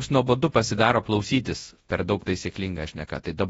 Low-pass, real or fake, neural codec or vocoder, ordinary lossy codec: 10.8 kHz; fake; codec, 24 kHz, 0.9 kbps, WavTokenizer, large speech release; AAC, 24 kbps